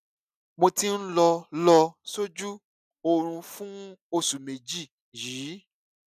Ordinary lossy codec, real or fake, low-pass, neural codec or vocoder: none; real; 14.4 kHz; none